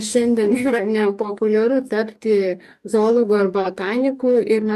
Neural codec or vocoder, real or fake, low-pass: codec, 44.1 kHz, 2.6 kbps, DAC; fake; 14.4 kHz